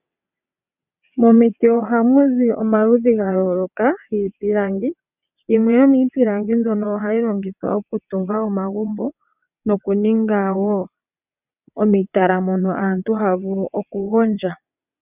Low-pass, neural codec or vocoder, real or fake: 3.6 kHz; vocoder, 22.05 kHz, 80 mel bands, WaveNeXt; fake